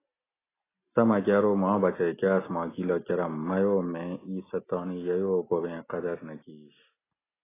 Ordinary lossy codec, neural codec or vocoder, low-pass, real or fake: AAC, 16 kbps; none; 3.6 kHz; real